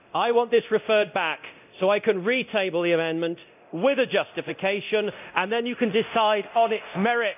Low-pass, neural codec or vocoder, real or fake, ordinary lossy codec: 3.6 kHz; codec, 24 kHz, 0.9 kbps, DualCodec; fake; none